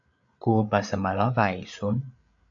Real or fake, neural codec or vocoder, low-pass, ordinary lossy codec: fake; codec, 16 kHz, 8 kbps, FreqCodec, larger model; 7.2 kHz; AAC, 64 kbps